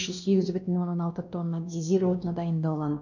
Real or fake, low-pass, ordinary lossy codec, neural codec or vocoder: fake; 7.2 kHz; none; codec, 16 kHz, 1 kbps, X-Codec, WavLM features, trained on Multilingual LibriSpeech